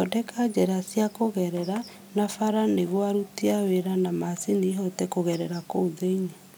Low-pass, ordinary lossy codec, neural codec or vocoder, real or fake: none; none; none; real